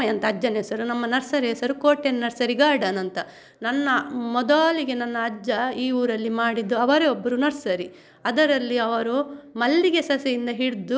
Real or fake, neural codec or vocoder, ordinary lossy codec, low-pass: real; none; none; none